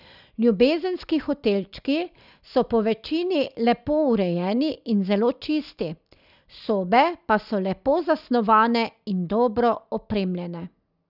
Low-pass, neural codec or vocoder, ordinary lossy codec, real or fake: 5.4 kHz; none; none; real